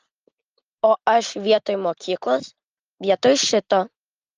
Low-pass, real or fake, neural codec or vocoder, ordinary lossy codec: 7.2 kHz; real; none; Opus, 24 kbps